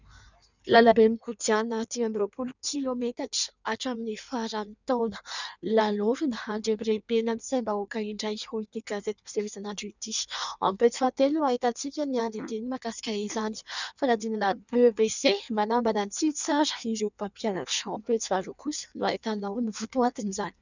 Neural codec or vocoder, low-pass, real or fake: codec, 16 kHz in and 24 kHz out, 1.1 kbps, FireRedTTS-2 codec; 7.2 kHz; fake